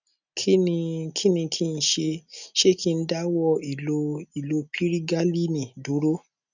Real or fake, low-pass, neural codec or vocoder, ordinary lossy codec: real; 7.2 kHz; none; none